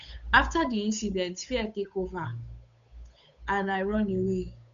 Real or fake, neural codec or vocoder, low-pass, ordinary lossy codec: fake; codec, 16 kHz, 8 kbps, FunCodec, trained on Chinese and English, 25 frames a second; 7.2 kHz; none